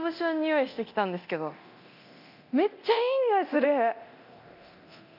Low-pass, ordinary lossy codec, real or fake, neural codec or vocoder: 5.4 kHz; none; fake; codec, 24 kHz, 0.9 kbps, DualCodec